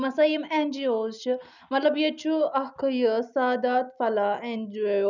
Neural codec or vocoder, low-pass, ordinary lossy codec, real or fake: none; 7.2 kHz; none; real